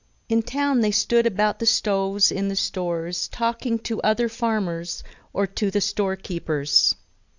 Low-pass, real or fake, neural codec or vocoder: 7.2 kHz; real; none